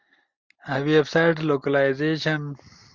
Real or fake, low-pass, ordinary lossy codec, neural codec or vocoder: real; 7.2 kHz; Opus, 32 kbps; none